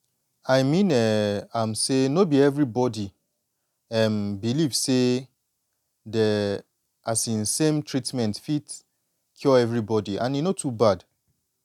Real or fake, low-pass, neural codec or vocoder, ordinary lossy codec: real; 19.8 kHz; none; none